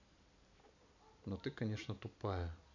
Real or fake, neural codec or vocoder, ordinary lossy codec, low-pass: real; none; none; 7.2 kHz